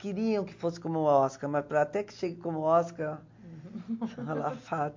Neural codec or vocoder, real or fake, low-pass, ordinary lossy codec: none; real; 7.2 kHz; MP3, 48 kbps